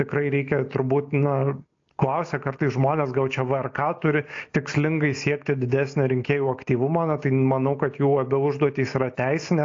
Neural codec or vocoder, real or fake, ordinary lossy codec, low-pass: none; real; AAC, 48 kbps; 7.2 kHz